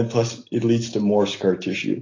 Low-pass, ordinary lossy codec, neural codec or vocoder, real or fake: 7.2 kHz; AAC, 32 kbps; none; real